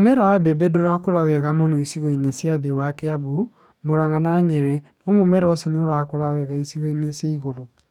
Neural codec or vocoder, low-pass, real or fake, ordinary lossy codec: codec, 44.1 kHz, 2.6 kbps, DAC; 19.8 kHz; fake; none